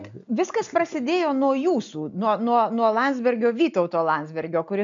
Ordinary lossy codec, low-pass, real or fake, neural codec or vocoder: MP3, 64 kbps; 7.2 kHz; real; none